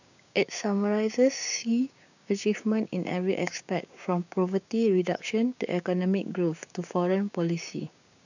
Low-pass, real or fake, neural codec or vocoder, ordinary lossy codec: 7.2 kHz; fake; codec, 16 kHz, 6 kbps, DAC; none